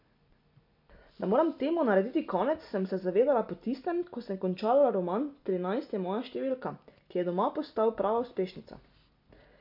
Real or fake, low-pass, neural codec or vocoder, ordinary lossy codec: real; 5.4 kHz; none; MP3, 48 kbps